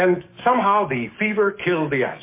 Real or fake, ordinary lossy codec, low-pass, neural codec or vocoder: fake; MP3, 24 kbps; 3.6 kHz; codec, 44.1 kHz, 7.8 kbps, DAC